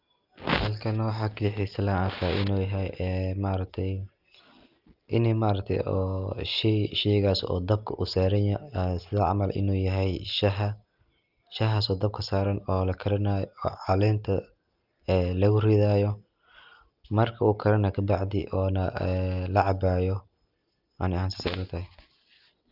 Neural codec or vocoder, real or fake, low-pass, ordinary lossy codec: none; real; 5.4 kHz; Opus, 24 kbps